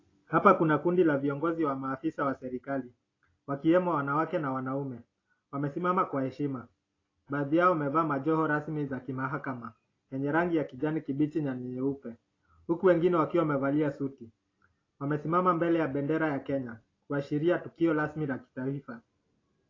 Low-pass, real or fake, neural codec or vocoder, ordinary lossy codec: 7.2 kHz; real; none; AAC, 32 kbps